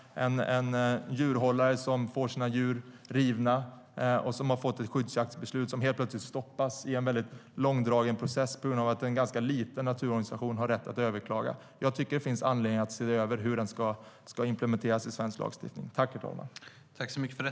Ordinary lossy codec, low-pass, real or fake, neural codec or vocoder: none; none; real; none